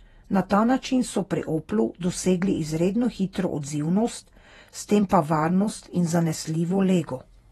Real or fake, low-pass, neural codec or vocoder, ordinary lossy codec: fake; 19.8 kHz; vocoder, 48 kHz, 128 mel bands, Vocos; AAC, 32 kbps